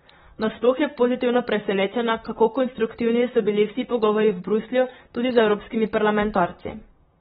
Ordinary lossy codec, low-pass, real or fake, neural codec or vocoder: AAC, 16 kbps; 19.8 kHz; fake; vocoder, 44.1 kHz, 128 mel bands, Pupu-Vocoder